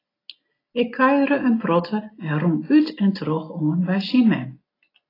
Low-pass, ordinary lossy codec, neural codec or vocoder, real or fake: 5.4 kHz; AAC, 24 kbps; none; real